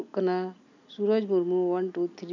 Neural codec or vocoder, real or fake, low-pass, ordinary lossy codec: none; real; 7.2 kHz; none